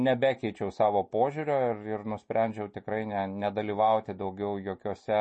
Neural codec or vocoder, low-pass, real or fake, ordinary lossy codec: none; 10.8 kHz; real; MP3, 32 kbps